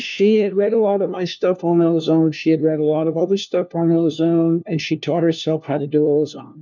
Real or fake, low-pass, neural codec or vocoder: fake; 7.2 kHz; codec, 16 kHz, 1 kbps, FunCodec, trained on LibriTTS, 50 frames a second